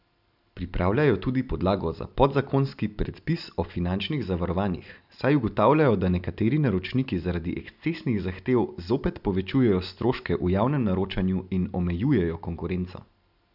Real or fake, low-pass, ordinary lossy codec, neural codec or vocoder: real; 5.4 kHz; none; none